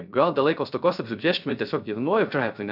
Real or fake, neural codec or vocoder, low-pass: fake; codec, 16 kHz, 0.3 kbps, FocalCodec; 5.4 kHz